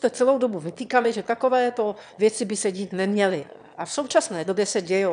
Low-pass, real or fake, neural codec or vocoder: 9.9 kHz; fake; autoencoder, 22.05 kHz, a latent of 192 numbers a frame, VITS, trained on one speaker